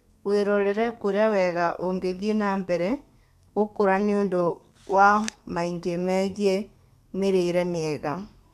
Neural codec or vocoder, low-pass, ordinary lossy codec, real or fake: codec, 32 kHz, 1.9 kbps, SNAC; 14.4 kHz; none; fake